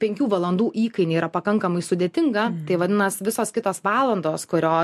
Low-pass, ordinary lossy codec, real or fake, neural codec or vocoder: 14.4 kHz; MP3, 64 kbps; real; none